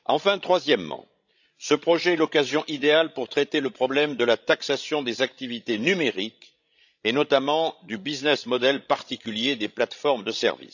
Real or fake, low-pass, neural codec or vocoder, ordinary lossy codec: fake; 7.2 kHz; codec, 16 kHz, 16 kbps, FreqCodec, larger model; none